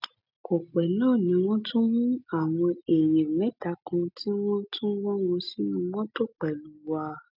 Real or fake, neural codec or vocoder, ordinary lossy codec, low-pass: real; none; none; 5.4 kHz